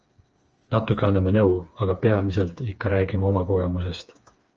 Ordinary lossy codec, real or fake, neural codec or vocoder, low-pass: Opus, 24 kbps; fake; codec, 16 kHz, 4 kbps, FreqCodec, smaller model; 7.2 kHz